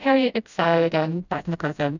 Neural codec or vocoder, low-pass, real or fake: codec, 16 kHz, 0.5 kbps, FreqCodec, smaller model; 7.2 kHz; fake